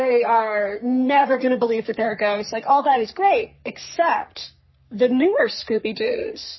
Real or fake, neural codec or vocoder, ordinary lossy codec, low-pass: fake; codec, 44.1 kHz, 3.4 kbps, Pupu-Codec; MP3, 24 kbps; 7.2 kHz